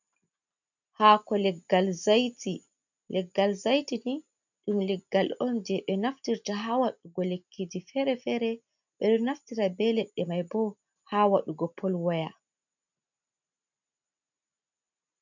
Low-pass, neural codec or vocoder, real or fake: 7.2 kHz; none; real